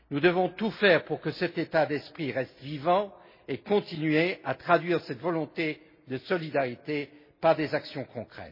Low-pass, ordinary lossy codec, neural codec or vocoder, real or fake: 5.4 kHz; MP3, 24 kbps; none; real